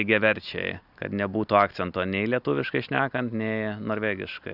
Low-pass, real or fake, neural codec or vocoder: 5.4 kHz; real; none